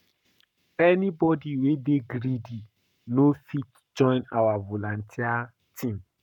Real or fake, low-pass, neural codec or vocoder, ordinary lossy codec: fake; 19.8 kHz; codec, 44.1 kHz, 7.8 kbps, Pupu-Codec; none